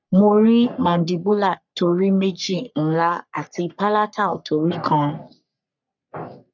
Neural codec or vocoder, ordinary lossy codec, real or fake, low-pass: codec, 44.1 kHz, 3.4 kbps, Pupu-Codec; none; fake; 7.2 kHz